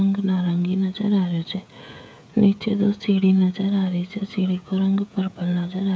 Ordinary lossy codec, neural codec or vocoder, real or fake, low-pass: none; codec, 16 kHz, 16 kbps, FreqCodec, smaller model; fake; none